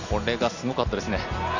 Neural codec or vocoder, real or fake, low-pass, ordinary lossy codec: none; real; 7.2 kHz; none